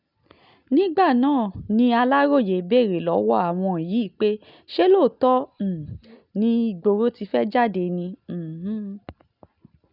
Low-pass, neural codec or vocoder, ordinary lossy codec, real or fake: 5.4 kHz; none; none; real